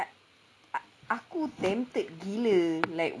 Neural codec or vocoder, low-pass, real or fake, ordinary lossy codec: none; none; real; none